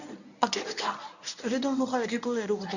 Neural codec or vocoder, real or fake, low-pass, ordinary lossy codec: codec, 24 kHz, 0.9 kbps, WavTokenizer, medium speech release version 1; fake; 7.2 kHz; none